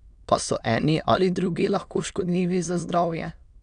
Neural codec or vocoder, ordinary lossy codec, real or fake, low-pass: autoencoder, 22.05 kHz, a latent of 192 numbers a frame, VITS, trained on many speakers; none; fake; 9.9 kHz